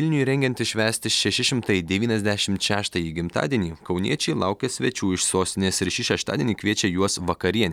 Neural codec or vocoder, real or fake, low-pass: none; real; 19.8 kHz